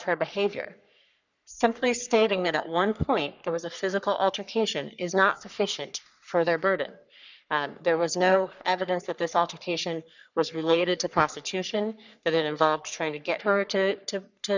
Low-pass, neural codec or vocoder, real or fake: 7.2 kHz; codec, 44.1 kHz, 3.4 kbps, Pupu-Codec; fake